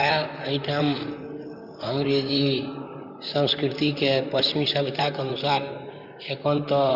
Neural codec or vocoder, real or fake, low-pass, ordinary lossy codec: vocoder, 44.1 kHz, 128 mel bands, Pupu-Vocoder; fake; 5.4 kHz; none